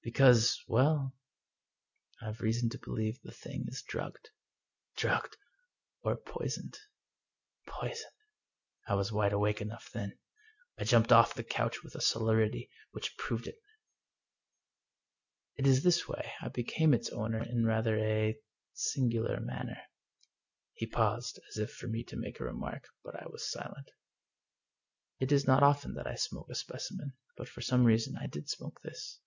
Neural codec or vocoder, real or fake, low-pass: none; real; 7.2 kHz